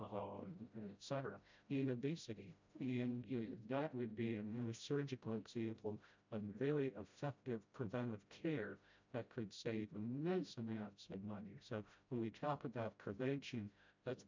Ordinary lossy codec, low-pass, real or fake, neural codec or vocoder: MP3, 64 kbps; 7.2 kHz; fake; codec, 16 kHz, 0.5 kbps, FreqCodec, smaller model